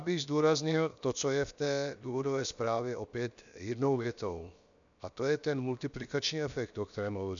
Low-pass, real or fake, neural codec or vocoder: 7.2 kHz; fake; codec, 16 kHz, 0.7 kbps, FocalCodec